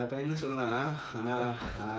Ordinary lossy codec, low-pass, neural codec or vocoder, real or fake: none; none; codec, 16 kHz, 4 kbps, FreqCodec, smaller model; fake